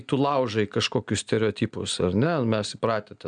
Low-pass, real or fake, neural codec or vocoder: 9.9 kHz; real; none